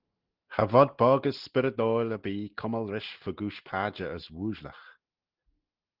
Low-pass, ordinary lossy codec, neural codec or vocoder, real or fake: 5.4 kHz; Opus, 16 kbps; none; real